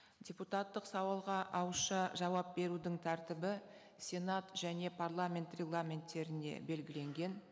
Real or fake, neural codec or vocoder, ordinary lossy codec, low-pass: real; none; none; none